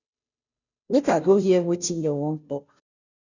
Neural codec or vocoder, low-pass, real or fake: codec, 16 kHz, 0.5 kbps, FunCodec, trained on Chinese and English, 25 frames a second; 7.2 kHz; fake